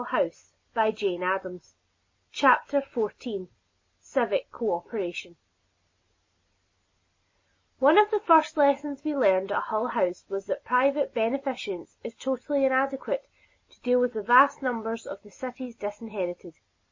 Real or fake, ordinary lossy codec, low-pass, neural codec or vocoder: real; MP3, 32 kbps; 7.2 kHz; none